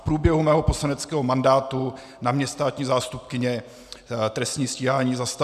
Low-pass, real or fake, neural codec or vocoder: 14.4 kHz; real; none